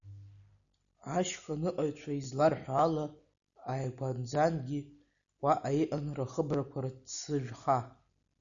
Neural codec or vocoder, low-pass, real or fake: none; 7.2 kHz; real